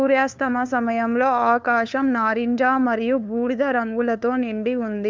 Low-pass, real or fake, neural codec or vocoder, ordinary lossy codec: none; fake; codec, 16 kHz, 2 kbps, FunCodec, trained on LibriTTS, 25 frames a second; none